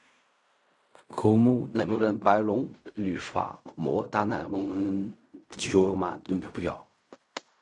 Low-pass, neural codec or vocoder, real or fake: 10.8 kHz; codec, 16 kHz in and 24 kHz out, 0.4 kbps, LongCat-Audio-Codec, fine tuned four codebook decoder; fake